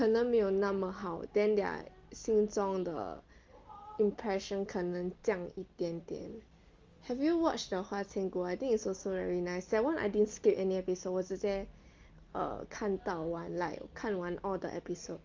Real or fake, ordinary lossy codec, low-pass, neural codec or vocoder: real; Opus, 32 kbps; 7.2 kHz; none